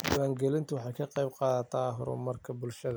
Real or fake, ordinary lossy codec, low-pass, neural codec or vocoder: real; none; none; none